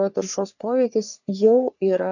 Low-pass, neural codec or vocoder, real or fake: 7.2 kHz; codec, 44.1 kHz, 3.4 kbps, Pupu-Codec; fake